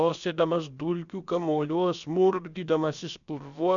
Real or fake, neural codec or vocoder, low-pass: fake; codec, 16 kHz, about 1 kbps, DyCAST, with the encoder's durations; 7.2 kHz